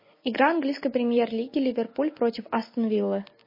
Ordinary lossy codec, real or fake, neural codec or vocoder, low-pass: MP3, 24 kbps; real; none; 5.4 kHz